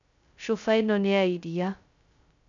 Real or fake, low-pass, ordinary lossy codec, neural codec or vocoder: fake; 7.2 kHz; none; codec, 16 kHz, 0.2 kbps, FocalCodec